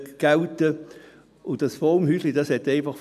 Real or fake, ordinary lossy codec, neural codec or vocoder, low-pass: real; none; none; 14.4 kHz